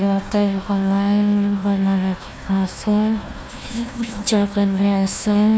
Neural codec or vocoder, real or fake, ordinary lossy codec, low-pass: codec, 16 kHz, 1 kbps, FunCodec, trained on Chinese and English, 50 frames a second; fake; none; none